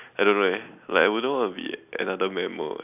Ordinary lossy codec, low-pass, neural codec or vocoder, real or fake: none; 3.6 kHz; none; real